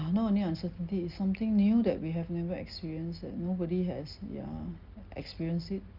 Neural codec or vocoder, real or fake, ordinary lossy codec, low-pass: none; real; Opus, 32 kbps; 5.4 kHz